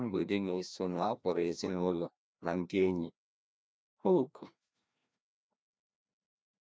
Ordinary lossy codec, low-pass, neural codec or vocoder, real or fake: none; none; codec, 16 kHz, 1 kbps, FreqCodec, larger model; fake